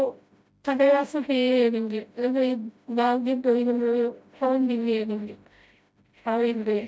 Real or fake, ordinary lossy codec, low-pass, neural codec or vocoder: fake; none; none; codec, 16 kHz, 0.5 kbps, FreqCodec, smaller model